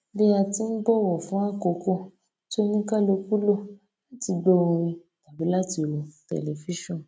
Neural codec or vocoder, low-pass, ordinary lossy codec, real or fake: none; none; none; real